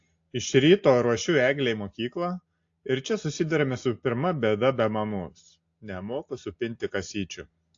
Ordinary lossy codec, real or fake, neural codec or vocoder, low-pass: AAC, 48 kbps; real; none; 7.2 kHz